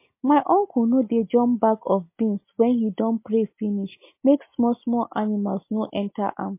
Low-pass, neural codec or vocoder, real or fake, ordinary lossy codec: 3.6 kHz; none; real; MP3, 24 kbps